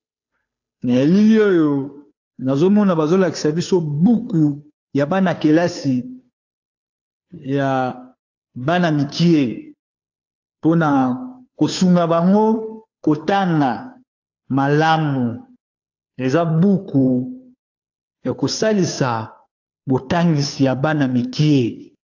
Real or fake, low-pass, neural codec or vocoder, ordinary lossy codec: fake; 7.2 kHz; codec, 16 kHz, 2 kbps, FunCodec, trained on Chinese and English, 25 frames a second; AAC, 48 kbps